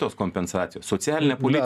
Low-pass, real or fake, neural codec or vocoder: 14.4 kHz; real; none